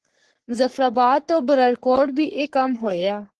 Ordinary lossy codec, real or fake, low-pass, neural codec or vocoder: Opus, 16 kbps; fake; 10.8 kHz; codec, 44.1 kHz, 3.4 kbps, Pupu-Codec